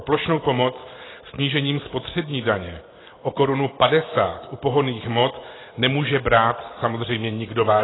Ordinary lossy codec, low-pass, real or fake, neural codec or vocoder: AAC, 16 kbps; 7.2 kHz; real; none